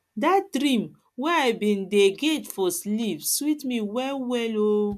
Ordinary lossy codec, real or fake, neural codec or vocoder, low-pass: MP3, 96 kbps; real; none; 14.4 kHz